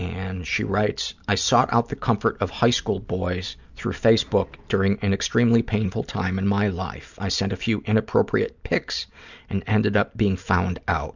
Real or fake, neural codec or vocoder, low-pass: real; none; 7.2 kHz